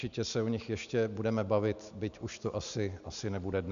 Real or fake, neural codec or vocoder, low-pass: real; none; 7.2 kHz